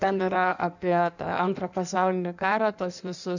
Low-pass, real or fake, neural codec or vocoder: 7.2 kHz; fake; codec, 16 kHz in and 24 kHz out, 1.1 kbps, FireRedTTS-2 codec